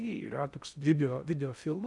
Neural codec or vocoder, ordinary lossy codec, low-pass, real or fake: codec, 16 kHz in and 24 kHz out, 0.6 kbps, FocalCodec, streaming, 4096 codes; MP3, 96 kbps; 10.8 kHz; fake